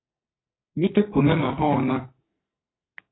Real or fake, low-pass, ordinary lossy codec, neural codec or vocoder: fake; 7.2 kHz; AAC, 16 kbps; codec, 44.1 kHz, 2.6 kbps, SNAC